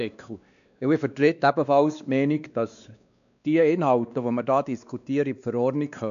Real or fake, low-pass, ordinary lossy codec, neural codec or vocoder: fake; 7.2 kHz; none; codec, 16 kHz, 2 kbps, X-Codec, WavLM features, trained on Multilingual LibriSpeech